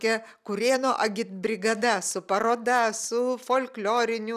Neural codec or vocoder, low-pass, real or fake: none; 14.4 kHz; real